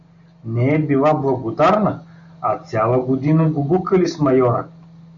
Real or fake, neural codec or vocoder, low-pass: real; none; 7.2 kHz